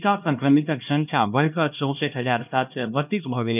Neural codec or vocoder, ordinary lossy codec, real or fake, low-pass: codec, 16 kHz, 1 kbps, X-Codec, HuBERT features, trained on LibriSpeech; none; fake; 3.6 kHz